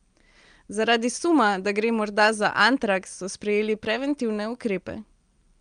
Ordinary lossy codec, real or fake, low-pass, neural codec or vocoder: Opus, 32 kbps; real; 9.9 kHz; none